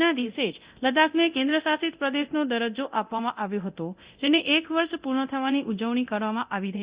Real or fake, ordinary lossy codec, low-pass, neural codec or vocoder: fake; Opus, 64 kbps; 3.6 kHz; codec, 24 kHz, 0.9 kbps, DualCodec